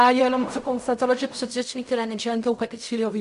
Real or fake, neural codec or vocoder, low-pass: fake; codec, 16 kHz in and 24 kHz out, 0.4 kbps, LongCat-Audio-Codec, fine tuned four codebook decoder; 10.8 kHz